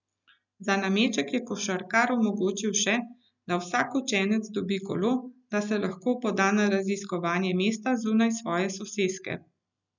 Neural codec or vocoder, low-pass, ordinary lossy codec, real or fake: none; 7.2 kHz; none; real